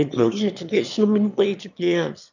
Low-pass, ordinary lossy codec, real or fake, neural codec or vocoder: 7.2 kHz; none; fake; autoencoder, 22.05 kHz, a latent of 192 numbers a frame, VITS, trained on one speaker